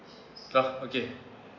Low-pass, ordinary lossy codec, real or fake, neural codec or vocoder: 7.2 kHz; none; real; none